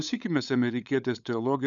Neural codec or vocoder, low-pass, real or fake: codec, 16 kHz, 8 kbps, FunCodec, trained on LibriTTS, 25 frames a second; 7.2 kHz; fake